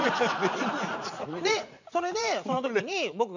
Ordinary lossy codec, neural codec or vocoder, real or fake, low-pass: none; vocoder, 44.1 kHz, 80 mel bands, Vocos; fake; 7.2 kHz